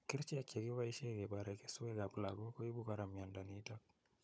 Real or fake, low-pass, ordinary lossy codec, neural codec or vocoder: fake; none; none; codec, 16 kHz, 16 kbps, FunCodec, trained on Chinese and English, 50 frames a second